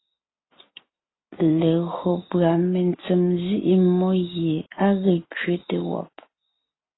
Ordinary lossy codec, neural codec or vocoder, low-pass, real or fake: AAC, 16 kbps; none; 7.2 kHz; real